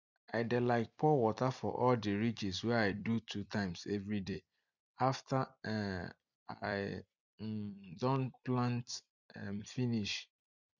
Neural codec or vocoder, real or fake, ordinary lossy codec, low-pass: vocoder, 44.1 kHz, 128 mel bands every 256 samples, BigVGAN v2; fake; none; 7.2 kHz